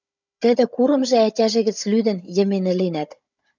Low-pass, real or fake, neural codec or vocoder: 7.2 kHz; fake; codec, 16 kHz, 16 kbps, FunCodec, trained on Chinese and English, 50 frames a second